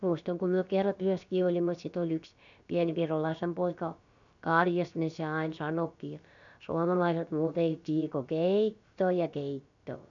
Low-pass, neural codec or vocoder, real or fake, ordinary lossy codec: 7.2 kHz; codec, 16 kHz, about 1 kbps, DyCAST, with the encoder's durations; fake; none